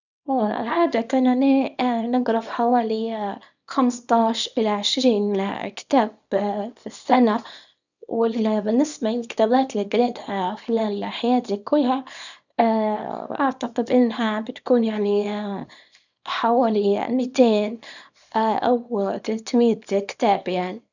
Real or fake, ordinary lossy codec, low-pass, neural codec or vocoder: fake; none; 7.2 kHz; codec, 24 kHz, 0.9 kbps, WavTokenizer, small release